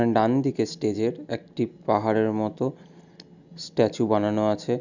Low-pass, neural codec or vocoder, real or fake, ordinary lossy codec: 7.2 kHz; none; real; none